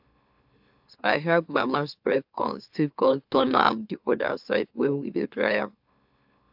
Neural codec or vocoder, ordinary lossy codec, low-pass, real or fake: autoencoder, 44.1 kHz, a latent of 192 numbers a frame, MeloTTS; AAC, 48 kbps; 5.4 kHz; fake